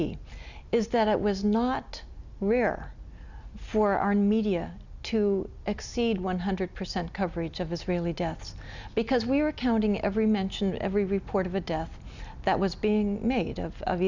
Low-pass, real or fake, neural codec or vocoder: 7.2 kHz; real; none